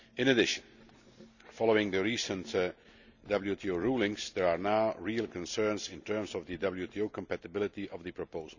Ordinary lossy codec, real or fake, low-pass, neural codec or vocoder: none; real; 7.2 kHz; none